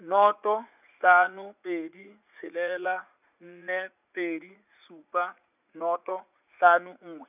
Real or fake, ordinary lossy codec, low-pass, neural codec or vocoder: fake; none; 3.6 kHz; vocoder, 44.1 kHz, 80 mel bands, Vocos